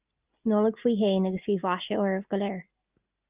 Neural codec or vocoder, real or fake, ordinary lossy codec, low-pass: none; real; Opus, 24 kbps; 3.6 kHz